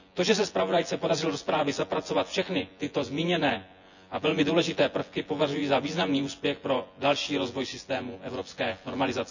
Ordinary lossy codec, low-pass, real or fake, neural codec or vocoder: none; 7.2 kHz; fake; vocoder, 24 kHz, 100 mel bands, Vocos